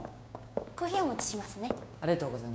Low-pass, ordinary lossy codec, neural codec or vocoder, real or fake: none; none; codec, 16 kHz, 6 kbps, DAC; fake